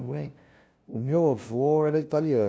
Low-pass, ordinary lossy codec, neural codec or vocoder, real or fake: none; none; codec, 16 kHz, 0.5 kbps, FunCodec, trained on LibriTTS, 25 frames a second; fake